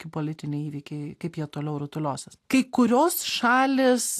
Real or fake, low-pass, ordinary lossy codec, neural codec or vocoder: real; 14.4 kHz; AAC, 64 kbps; none